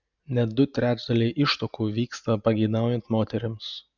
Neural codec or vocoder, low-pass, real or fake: none; 7.2 kHz; real